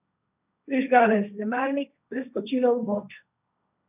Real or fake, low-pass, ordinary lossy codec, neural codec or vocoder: fake; 3.6 kHz; none; codec, 16 kHz, 1.1 kbps, Voila-Tokenizer